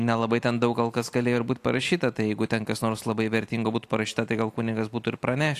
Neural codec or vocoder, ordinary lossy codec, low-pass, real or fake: none; AAC, 64 kbps; 14.4 kHz; real